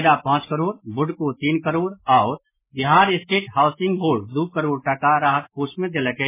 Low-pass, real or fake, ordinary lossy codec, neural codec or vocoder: 3.6 kHz; real; MP3, 16 kbps; none